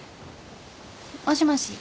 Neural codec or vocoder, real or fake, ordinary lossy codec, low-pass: none; real; none; none